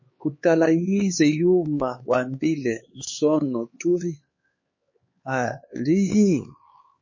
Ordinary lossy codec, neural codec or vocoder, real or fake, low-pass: MP3, 32 kbps; codec, 16 kHz, 4 kbps, X-Codec, HuBERT features, trained on LibriSpeech; fake; 7.2 kHz